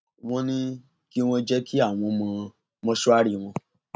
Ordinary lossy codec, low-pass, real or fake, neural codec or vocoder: none; none; real; none